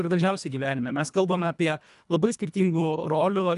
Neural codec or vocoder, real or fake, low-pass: codec, 24 kHz, 1.5 kbps, HILCodec; fake; 10.8 kHz